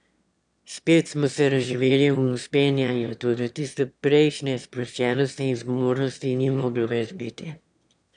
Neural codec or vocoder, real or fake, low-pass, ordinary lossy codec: autoencoder, 22.05 kHz, a latent of 192 numbers a frame, VITS, trained on one speaker; fake; 9.9 kHz; none